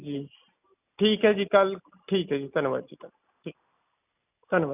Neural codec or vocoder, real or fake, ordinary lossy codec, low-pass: none; real; none; 3.6 kHz